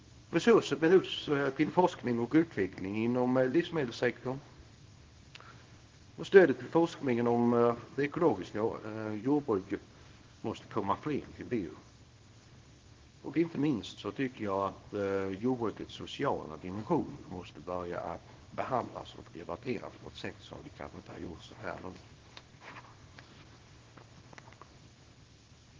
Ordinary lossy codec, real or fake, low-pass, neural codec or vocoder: Opus, 16 kbps; fake; 7.2 kHz; codec, 24 kHz, 0.9 kbps, WavTokenizer, small release